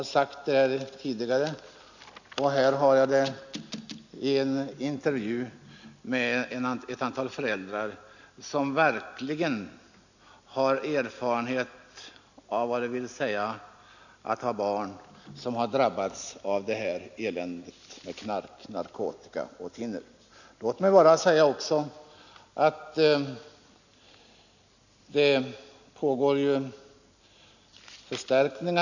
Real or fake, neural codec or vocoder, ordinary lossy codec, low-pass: real; none; none; 7.2 kHz